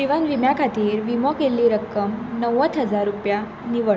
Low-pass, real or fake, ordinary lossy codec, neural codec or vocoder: none; real; none; none